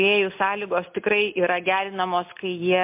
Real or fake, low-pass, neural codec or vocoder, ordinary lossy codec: real; 3.6 kHz; none; MP3, 32 kbps